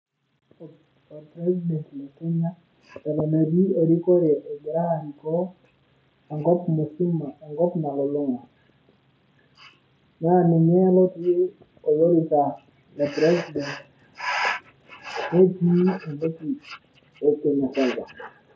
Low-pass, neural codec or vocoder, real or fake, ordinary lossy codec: none; none; real; none